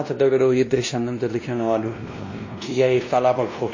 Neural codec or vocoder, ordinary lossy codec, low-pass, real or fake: codec, 16 kHz, 1 kbps, X-Codec, WavLM features, trained on Multilingual LibriSpeech; MP3, 32 kbps; 7.2 kHz; fake